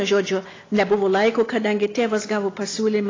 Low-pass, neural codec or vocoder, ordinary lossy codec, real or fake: 7.2 kHz; none; AAC, 32 kbps; real